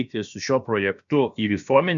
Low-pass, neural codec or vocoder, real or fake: 7.2 kHz; codec, 16 kHz, about 1 kbps, DyCAST, with the encoder's durations; fake